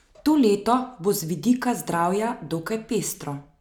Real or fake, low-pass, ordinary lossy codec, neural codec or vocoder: real; 19.8 kHz; none; none